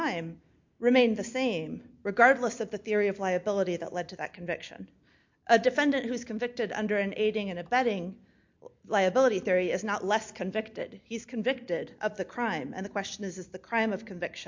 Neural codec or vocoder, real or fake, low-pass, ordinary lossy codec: none; real; 7.2 kHz; MP3, 48 kbps